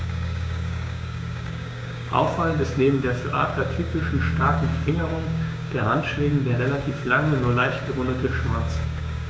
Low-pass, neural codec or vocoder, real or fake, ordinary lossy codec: none; codec, 16 kHz, 6 kbps, DAC; fake; none